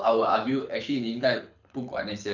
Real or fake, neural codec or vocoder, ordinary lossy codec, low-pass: fake; codec, 24 kHz, 6 kbps, HILCodec; none; 7.2 kHz